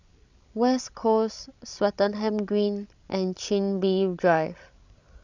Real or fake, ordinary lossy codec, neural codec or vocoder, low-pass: fake; none; codec, 16 kHz, 16 kbps, FreqCodec, larger model; 7.2 kHz